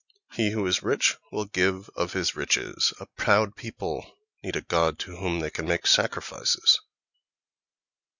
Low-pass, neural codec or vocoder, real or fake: 7.2 kHz; none; real